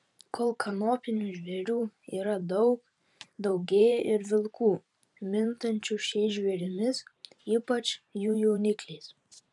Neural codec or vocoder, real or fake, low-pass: vocoder, 44.1 kHz, 128 mel bands every 512 samples, BigVGAN v2; fake; 10.8 kHz